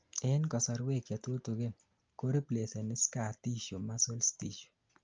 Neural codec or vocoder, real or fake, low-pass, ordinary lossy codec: none; real; 7.2 kHz; Opus, 24 kbps